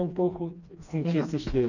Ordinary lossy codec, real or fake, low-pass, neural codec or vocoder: none; fake; 7.2 kHz; codec, 16 kHz, 2 kbps, FreqCodec, smaller model